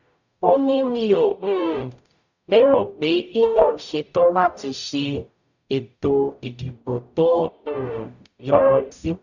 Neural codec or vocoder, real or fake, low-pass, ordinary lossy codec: codec, 44.1 kHz, 0.9 kbps, DAC; fake; 7.2 kHz; none